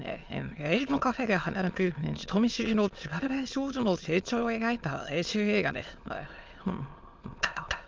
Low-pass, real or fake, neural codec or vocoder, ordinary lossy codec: 7.2 kHz; fake; autoencoder, 22.05 kHz, a latent of 192 numbers a frame, VITS, trained on many speakers; Opus, 24 kbps